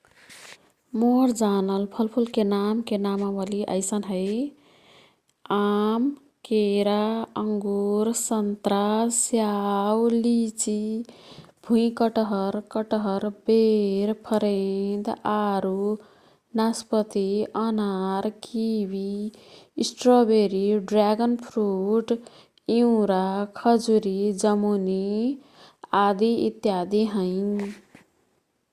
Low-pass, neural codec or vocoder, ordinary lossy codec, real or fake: 14.4 kHz; none; Opus, 64 kbps; real